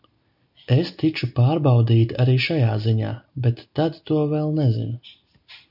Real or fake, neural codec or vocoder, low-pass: real; none; 5.4 kHz